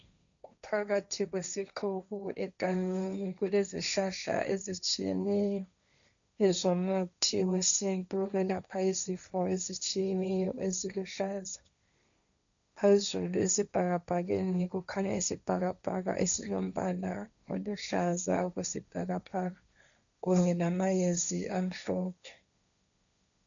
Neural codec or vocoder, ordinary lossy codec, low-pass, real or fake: codec, 16 kHz, 1.1 kbps, Voila-Tokenizer; MP3, 96 kbps; 7.2 kHz; fake